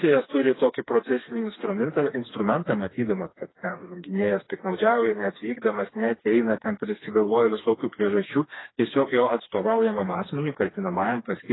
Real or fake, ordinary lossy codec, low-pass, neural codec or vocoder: fake; AAC, 16 kbps; 7.2 kHz; codec, 16 kHz, 2 kbps, FreqCodec, smaller model